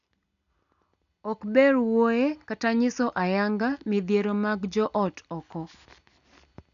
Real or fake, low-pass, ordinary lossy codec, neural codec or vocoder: real; 7.2 kHz; none; none